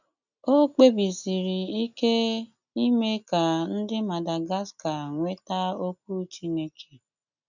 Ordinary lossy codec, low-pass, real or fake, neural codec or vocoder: none; 7.2 kHz; real; none